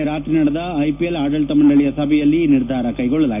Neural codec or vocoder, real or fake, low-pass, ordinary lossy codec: none; real; 3.6 kHz; none